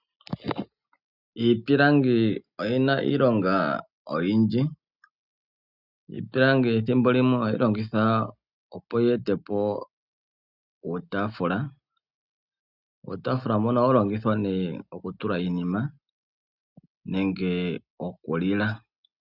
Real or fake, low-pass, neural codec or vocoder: real; 5.4 kHz; none